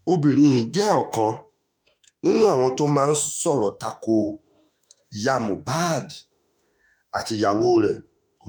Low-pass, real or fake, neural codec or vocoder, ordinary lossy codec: none; fake; autoencoder, 48 kHz, 32 numbers a frame, DAC-VAE, trained on Japanese speech; none